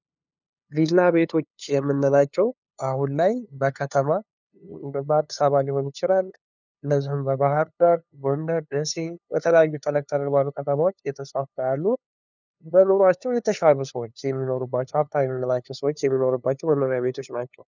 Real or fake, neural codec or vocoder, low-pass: fake; codec, 16 kHz, 2 kbps, FunCodec, trained on LibriTTS, 25 frames a second; 7.2 kHz